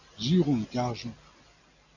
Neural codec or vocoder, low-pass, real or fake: none; 7.2 kHz; real